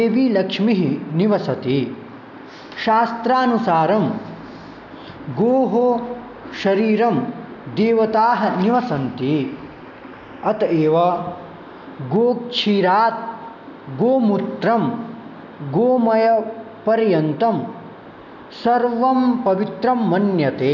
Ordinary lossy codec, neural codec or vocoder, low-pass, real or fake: none; none; 7.2 kHz; real